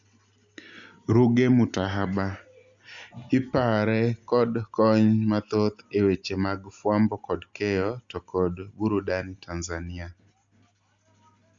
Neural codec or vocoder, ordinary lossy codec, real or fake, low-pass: none; Opus, 64 kbps; real; 7.2 kHz